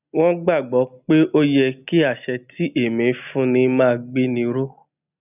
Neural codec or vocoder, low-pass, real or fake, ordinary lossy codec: none; 3.6 kHz; real; none